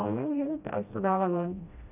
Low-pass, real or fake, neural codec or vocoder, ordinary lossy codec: 3.6 kHz; fake; codec, 16 kHz, 1 kbps, FreqCodec, smaller model; none